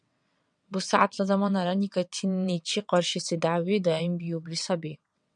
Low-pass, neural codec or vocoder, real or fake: 9.9 kHz; vocoder, 22.05 kHz, 80 mel bands, WaveNeXt; fake